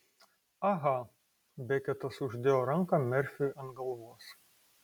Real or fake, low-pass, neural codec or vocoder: real; 19.8 kHz; none